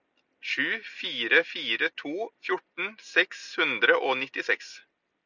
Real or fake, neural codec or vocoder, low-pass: real; none; 7.2 kHz